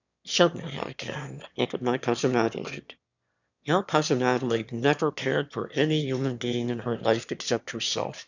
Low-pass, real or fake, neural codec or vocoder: 7.2 kHz; fake; autoencoder, 22.05 kHz, a latent of 192 numbers a frame, VITS, trained on one speaker